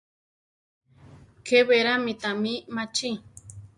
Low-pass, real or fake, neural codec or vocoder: 10.8 kHz; real; none